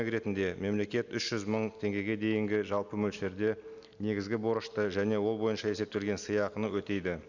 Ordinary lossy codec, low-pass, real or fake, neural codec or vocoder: none; 7.2 kHz; real; none